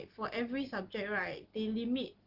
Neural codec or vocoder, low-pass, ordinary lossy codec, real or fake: none; 5.4 kHz; Opus, 16 kbps; real